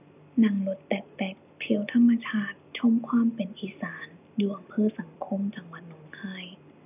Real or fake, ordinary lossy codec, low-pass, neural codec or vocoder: real; none; 3.6 kHz; none